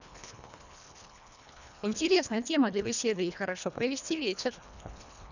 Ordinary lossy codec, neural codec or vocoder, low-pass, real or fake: none; codec, 24 kHz, 1.5 kbps, HILCodec; 7.2 kHz; fake